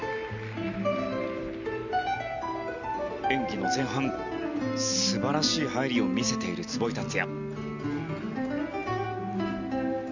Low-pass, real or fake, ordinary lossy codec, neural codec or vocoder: 7.2 kHz; real; none; none